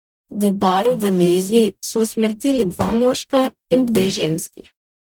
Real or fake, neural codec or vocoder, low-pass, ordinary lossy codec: fake; codec, 44.1 kHz, 0.9 kbps, DAC; none; none